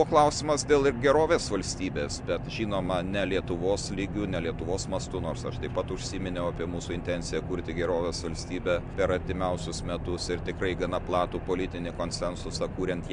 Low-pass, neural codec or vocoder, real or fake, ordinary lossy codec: 9.9 kHz; none; real; MP3, 64 kbps